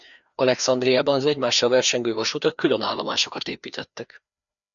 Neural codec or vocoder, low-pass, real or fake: codec, 16 kHz, 2 kbps, FreqCodec, larger model; 7.2 kHz; fake